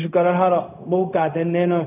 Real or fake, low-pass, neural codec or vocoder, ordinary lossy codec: fake; 3.6 kHz; codec, 16 kHz, 0.4 kbps, LongCat-Audio-Codec; none